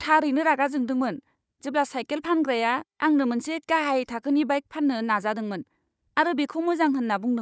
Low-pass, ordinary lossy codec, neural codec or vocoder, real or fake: none; none; codec, 16 kHz, 4 kbps, FunCodec, trained on Chinese and English, 50 frames a second; fake